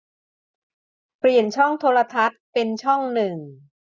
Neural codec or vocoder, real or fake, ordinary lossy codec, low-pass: none; real; none; none